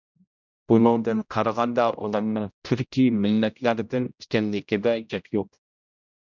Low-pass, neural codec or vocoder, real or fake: 7.2 kHz; codec, 16 kHz, 0.5 kbps, X-Codec, HuBERT features, trained on general audio; fake